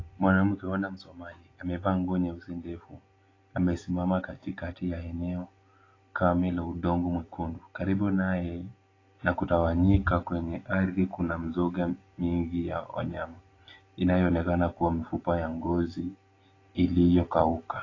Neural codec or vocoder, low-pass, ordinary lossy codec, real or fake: none; 7.2 kHz; AAC, 32 kbps; real